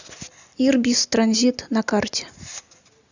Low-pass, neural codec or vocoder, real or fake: 7.2 kHz; none; real